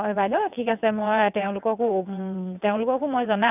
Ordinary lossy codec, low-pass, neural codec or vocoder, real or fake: none; 3.6 kHz; vocoder, 22.05 kHz, 80 mel bands, WaveNeXt; fake